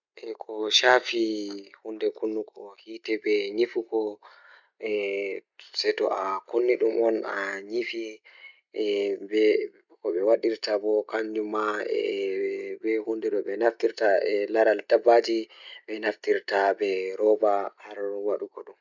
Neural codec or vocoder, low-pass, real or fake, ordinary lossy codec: none; 7.2 kHz; real; none